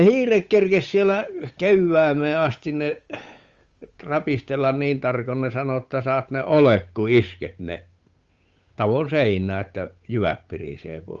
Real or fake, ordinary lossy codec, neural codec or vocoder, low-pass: fake; Opus, 32 kbps; codec, 16 kHz, 8 kbps, FunCodec, trained on Chinese and English, 25 frames a second; 7.2 kHz